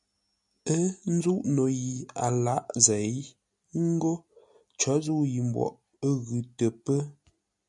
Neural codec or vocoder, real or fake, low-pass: none; real; 10.8 kHz